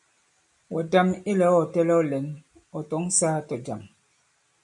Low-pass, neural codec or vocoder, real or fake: 10.8 kHz; none; real